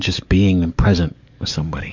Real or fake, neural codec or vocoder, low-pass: fake; codec, 44.1 kHz, 7.8 kbps, DAC; 7.2 kHz